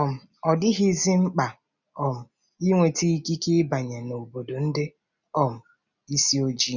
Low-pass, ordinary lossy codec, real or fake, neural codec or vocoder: 7.2 kHz; none; real; none